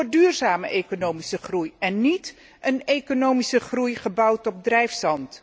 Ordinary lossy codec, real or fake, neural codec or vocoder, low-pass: none; real; none; none